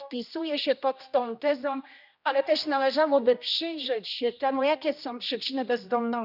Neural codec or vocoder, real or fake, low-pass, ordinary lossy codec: codec, 16 kHz, 1 kbps, X-Codec, HuBERT features, trained on general audio; fake; 5.4 kHz; none